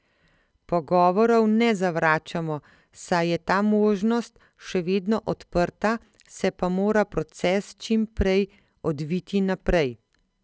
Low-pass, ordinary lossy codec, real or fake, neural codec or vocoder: none; none; real; none